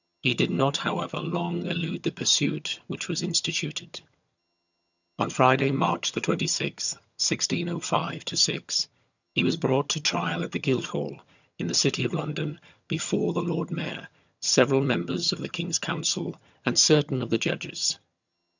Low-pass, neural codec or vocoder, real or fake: 7.2 kHz; vocoder, 22.05 kHz, 80 mel bands, HiFi-GAN; fake